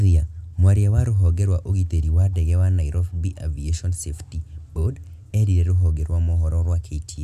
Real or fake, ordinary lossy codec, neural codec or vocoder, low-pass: real; none; none; 14.4 kHz